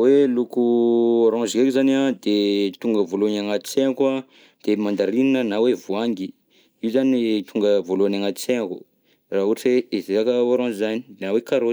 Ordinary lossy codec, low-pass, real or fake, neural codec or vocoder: none; none; real; none